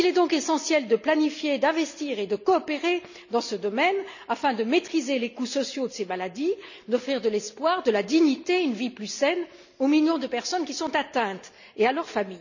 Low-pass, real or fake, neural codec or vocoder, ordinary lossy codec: 7.2 kHz; real; none; none